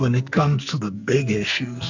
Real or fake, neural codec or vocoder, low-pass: fake; codec, 44.1 kHz, 2.6 kbps, SNAC; 7.2 kHz